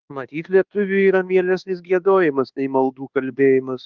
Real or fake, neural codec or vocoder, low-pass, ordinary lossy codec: fake; codec, 24 kHz, 1.2 kbps, DualCodec; 7.2 kHz; Opus, 16 kbps